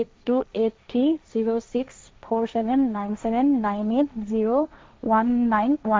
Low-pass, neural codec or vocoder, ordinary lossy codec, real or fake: none; codec, 16 kHz, 1.1 kbps, Voila-Tokenizer; none; fake